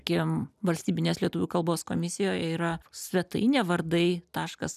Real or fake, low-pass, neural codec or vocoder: real; 14.4 kHz; none